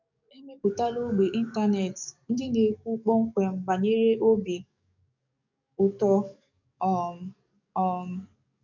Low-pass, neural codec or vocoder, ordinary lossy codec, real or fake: 7.2 kHz; codec, 44.1 kHz, 7.8 kbps, DAC; none; fake